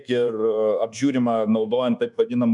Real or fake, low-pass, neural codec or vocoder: fake; 10.8 kHz; codec, 24 kHz, 1.2 kbps, DualCodec